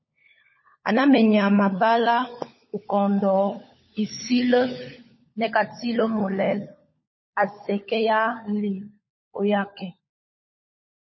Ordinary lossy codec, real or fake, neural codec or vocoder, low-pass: MP3, 24 kbps; fake; codec, 16 kHz, 16 kbps, FunCodec, trained on LibriTTS, 50 frames a second; 7.2 kHz